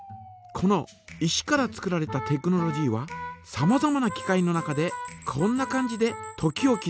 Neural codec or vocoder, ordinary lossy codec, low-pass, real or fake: none; none; none; real